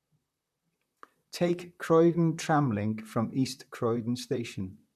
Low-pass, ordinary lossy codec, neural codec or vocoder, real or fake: 14.4 kHz; none; vocoder, 44.1 kHz, 128 mel bands, Pupu-Vocoder; fake